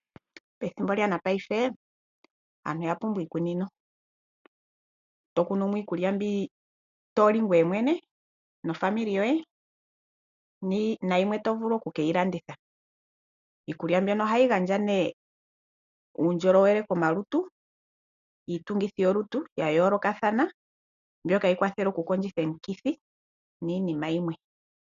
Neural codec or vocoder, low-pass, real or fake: none; 7.2 kHz; real